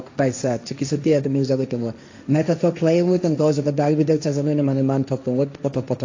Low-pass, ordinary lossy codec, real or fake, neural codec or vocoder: 7.2 kHz; AAC, 48 kbps; fake; codec, 16 kHz, 1.1 kbps, Voila-Tokenizer